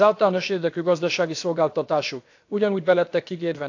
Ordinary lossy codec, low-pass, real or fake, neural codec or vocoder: AAC, 48 kbps; 7.2 kHz; fake; codec, 16 kHz, about 1 kbps, DyCAST, with the encoder's durations